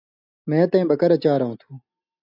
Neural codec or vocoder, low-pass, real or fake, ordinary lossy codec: none; 5.4 kHz; real; AAC, 48 kbps